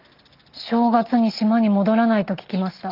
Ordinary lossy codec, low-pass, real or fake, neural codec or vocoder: Opus, 32 kbps; 5.4 kHz; real; none